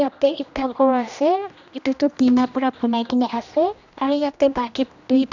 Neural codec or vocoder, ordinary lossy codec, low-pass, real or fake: codec, 16 kHz, 1 kbps, X-Codec, HuBERT features, trained on general audio; none; 7.2 kHz; fake